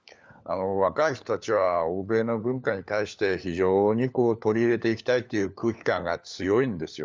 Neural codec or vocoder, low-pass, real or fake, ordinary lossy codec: codec, 16 kHz, 4 kbps, FunCodec, trained on LibriTTS, 50 frames a second; none; fake; none